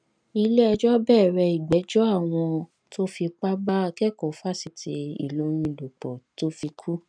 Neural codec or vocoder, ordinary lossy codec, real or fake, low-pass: none; none; real; 9.9 kHz